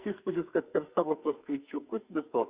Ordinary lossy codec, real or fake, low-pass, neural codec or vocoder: Opus, 64 kbps; fake; 3.6 kHz; codec, 44.1 kHz, 2.6 kbps, SNAC